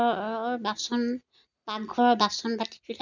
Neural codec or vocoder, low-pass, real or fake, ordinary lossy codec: none; 7.2 kHz; real; none